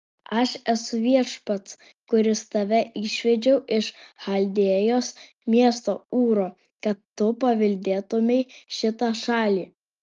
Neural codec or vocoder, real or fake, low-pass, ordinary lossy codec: none; real; 7.2 kHz; Opus, 32 kbps